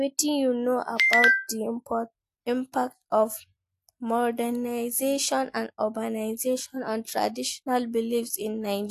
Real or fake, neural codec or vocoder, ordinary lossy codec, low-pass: real; none; AAC, 64 kbps; 14.4 kHz